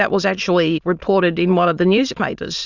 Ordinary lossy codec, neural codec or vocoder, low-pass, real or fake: Opus, 64 kbps; autoencoder, 22.05 kHz, a latent of 192 numbers a frame, VITS, trained on many speakers; 7.2 kHz; fake